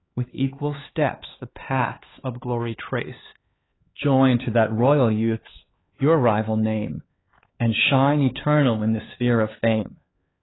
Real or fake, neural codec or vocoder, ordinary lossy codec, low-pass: fake; codec, 16 kHz, 4 kbps, X-Codec, HuBERT features, trained on LibriSpeech; AAC, 16 kbps; 7.2 kHz